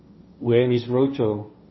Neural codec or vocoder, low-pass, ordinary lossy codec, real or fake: codec, 16 kHz, 1.1 kbps, Voila-Tokenizer; 7.2 kHz; MP3, 24 kbps; fake